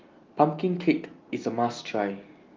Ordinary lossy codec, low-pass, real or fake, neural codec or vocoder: Opus, 24 kbps; 7.2 kHz; real; none